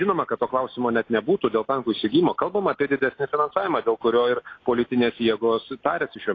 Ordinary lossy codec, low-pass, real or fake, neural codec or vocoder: AAC, 32 kbps; 7.2 kHz; real; none